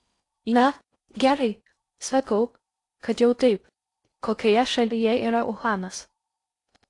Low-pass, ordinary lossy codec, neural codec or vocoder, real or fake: 10.8 kHz; AAC, 48 kbps; codec, 16 kHz in and 24 kHz out, 0.6 kbps, FocalCodec, streaming, 4096 codes; fake